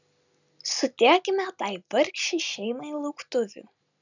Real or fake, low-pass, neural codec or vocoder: real; 7.2 kHz; none